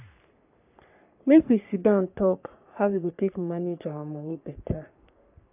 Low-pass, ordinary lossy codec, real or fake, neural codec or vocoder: 3.6 kHz; AAC, 32 kbps; fake; codec, 44.1 kHz, 3.4 kbps, Pupu-Codec